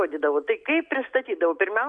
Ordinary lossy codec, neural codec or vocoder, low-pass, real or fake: AAC, 64 kbps; none; 9.9 kHz; real